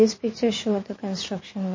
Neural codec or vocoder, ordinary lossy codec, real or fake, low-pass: vocoder, 44.1 kHz, 80 mel bands, Vocos; MP3, 32 kbps; fake; 7.2 kHz